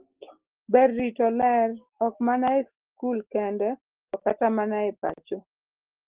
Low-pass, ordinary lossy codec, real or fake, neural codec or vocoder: 3.6 kHz; Opus, 16 kbps; real; none